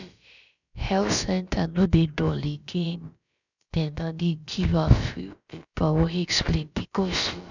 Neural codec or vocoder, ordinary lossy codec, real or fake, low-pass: codec, 16 kHz, about 1 kbps, DyCAST, with the encoder's durations; none; fake; 7.2 kHz